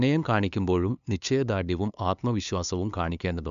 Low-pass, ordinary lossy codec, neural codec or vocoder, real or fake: 7.2 kHz; none; codec, 16 kHz, 2 kbps, FunCodec, trained on LibriTTS, 25 frames a second; fake